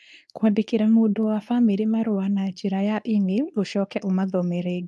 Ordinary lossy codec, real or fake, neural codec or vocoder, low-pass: none; fake; codec, 24 kHz, 0.9 kbps, WavTokenizer, medium speech release version 2; none